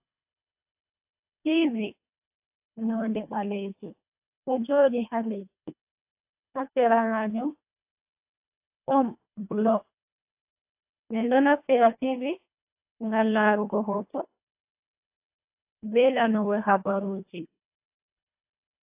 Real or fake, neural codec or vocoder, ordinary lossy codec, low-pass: fake; codec, 24 kHz, 1.5 kbps, HILCodec; AAC, 32 kbps; 3.6 kHz